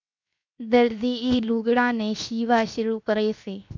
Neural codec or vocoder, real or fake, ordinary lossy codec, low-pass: codec, 16 kHz, 0.7 kbps, FocalCodec; fake; MP3, 64 kbps; 7.2 kHz